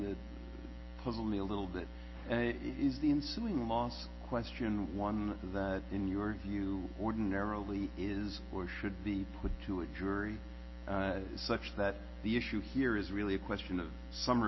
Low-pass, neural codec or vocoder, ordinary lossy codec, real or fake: 7.2 kHz; none; MP3, 24 kbps; real